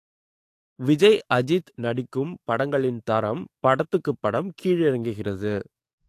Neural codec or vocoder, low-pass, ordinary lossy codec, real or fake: codec, 44.1 kHz, 7.8 kbps, DAC; 14.4 kHz; AAC, 64 kbps; fake